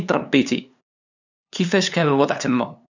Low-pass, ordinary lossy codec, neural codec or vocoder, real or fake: 7.2 kHz; none; codec, 16 kHz, 2 kbps, FunCodec, trained on LibriTTS, 25 frames a second; fake